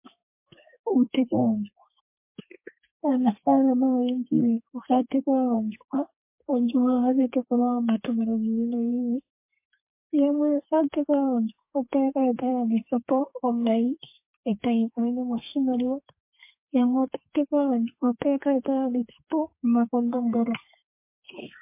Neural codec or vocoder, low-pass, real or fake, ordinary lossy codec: codec, 32 kHz, 1.9 kbps, SNAC; 3.6 kHz; fake; MP3, 24 kbps